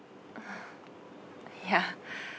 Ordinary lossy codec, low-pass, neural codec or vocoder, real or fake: none; none; none; real